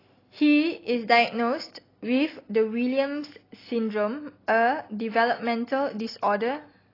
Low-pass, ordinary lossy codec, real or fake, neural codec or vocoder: 5.4 kHz; AAC, 24 kbps; real; none